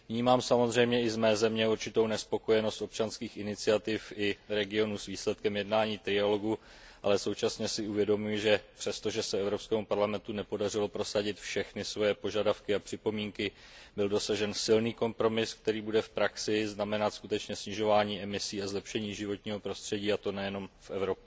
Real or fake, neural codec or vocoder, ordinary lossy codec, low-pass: real; none; none; none